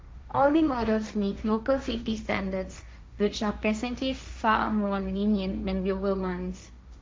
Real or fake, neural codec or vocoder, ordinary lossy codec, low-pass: fake; codec, 16 kHz, 1.1 kbps, Voila-Tokenizer; none; none